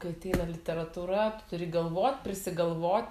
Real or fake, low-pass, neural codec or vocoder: real; 14.4 kHz; none